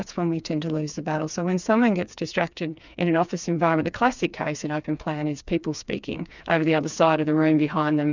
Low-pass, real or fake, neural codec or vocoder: 7.2 kHz; fake; codec, 16 kHz, 4 kbps, FreqCodec, smaller model